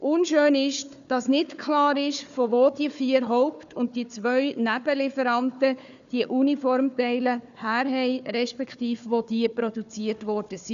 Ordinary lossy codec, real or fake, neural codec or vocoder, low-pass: none; fake; codec, 16 kHz, 4 kbps, FunCodec, trained on Chinese and English, 50 frames a second; 7.2 kHz